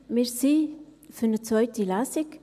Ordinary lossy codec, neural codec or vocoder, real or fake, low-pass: none; none; real; 14.4 kHz